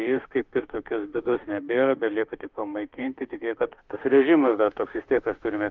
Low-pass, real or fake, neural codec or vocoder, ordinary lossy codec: 7.2 kHz; fake; autoencoder, 48 kHz, 32 numbers a frame, DAC-VAE, trained on Japanese speech; Opus, 32 kbps